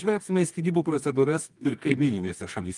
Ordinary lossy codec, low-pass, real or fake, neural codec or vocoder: Opus, 32 kbps; 10.8 kHz; fake; codec, 24 kHz, 0.9 kbps, WavTokenizer, medium music audio release